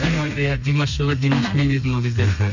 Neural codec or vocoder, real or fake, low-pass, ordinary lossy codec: codec, 32 kHz, 1.9 kbps, SNAC; fake; 7.2 kHz; none